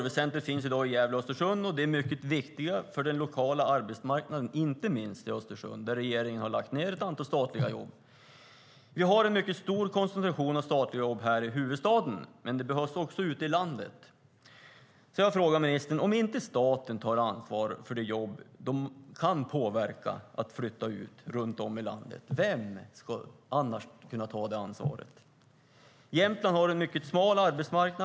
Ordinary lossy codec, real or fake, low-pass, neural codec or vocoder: none; real; none; none